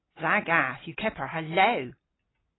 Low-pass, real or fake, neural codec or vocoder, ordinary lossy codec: 7.2 kHz; real; none; AAC, 16 kbps